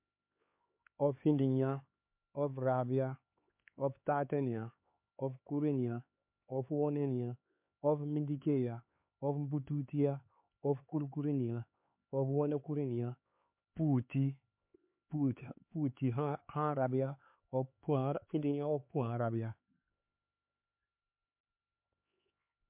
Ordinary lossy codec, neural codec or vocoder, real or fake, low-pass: MP3, 32 kbps; codec, 16 kHz, 4 kbps, X-Codec, HuBERT features, trained on LibriSpeech; fake; 3.6 kHz